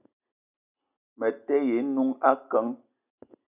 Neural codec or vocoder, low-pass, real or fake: none; 3.6 kHz; real